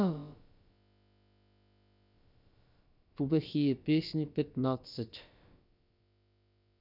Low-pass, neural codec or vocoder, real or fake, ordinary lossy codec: 5.4 kHz; codec, 16 kHz, about 1 kbps, DyCAST, with the encoder's durations; fake; none